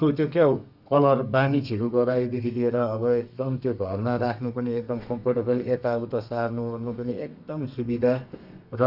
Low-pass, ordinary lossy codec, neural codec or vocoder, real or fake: 5.4 kHz; none; codec, 32 kHz, 1.9 kbps, SNAC; fake